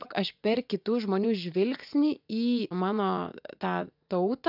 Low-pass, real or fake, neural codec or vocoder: 5.4 kHz; fake; vocoder, 24 kHz, 100 mel bands, Vocos